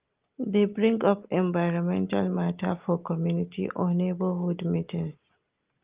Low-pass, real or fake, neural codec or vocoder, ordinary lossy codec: 3.6 kHz; real; none; Opus, 32 kbps